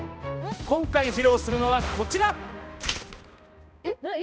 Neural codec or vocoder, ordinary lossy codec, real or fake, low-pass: codec, 16 kHz, 1 kbps, X-Codec, HuBERT features, trained on balanced general audio; none; fake; none